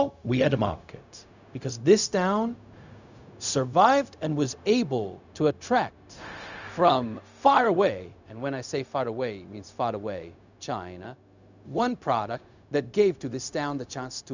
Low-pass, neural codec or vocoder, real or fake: 7.2 kHz; codec, 16 kHz, 0.4 kbps, LongCat-Audio-Codec; fake